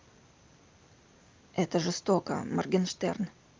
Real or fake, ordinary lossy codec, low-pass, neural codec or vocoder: real; Opus, 24 kbps; 7.2 kHz; none